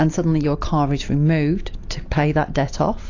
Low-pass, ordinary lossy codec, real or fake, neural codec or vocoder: 7.2 kHz; AAC, 48 kbps; real; none